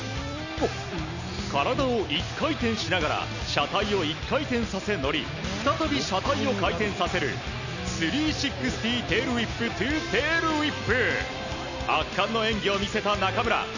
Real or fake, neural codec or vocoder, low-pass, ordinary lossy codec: real; none; 7.2 kHz; none